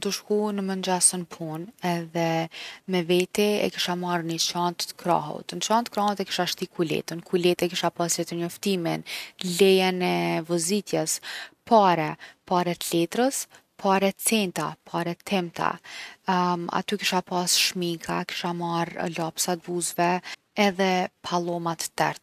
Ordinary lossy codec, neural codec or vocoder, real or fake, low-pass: none; none; real; 14.4 kHz